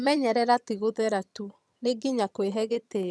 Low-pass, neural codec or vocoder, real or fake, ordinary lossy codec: none; vocoder, 22.05 kHz, 80 mel bands, HiFi-GAN; fake; none